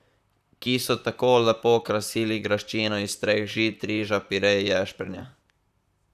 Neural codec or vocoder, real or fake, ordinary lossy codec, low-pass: vocoder, 44.1 kHz, 128 mel bands, Pupu-Vocoder; fake; none; 14.4 kHz